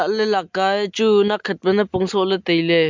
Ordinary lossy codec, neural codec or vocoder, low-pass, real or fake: MP3, 64 kbps; none; 7.2 kHz; real